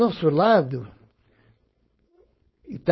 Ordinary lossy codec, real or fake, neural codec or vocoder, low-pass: MP3, 24 kbps; fake; codec, 16 kHz, 4.8 kbps, FACodec; 7.2 kHz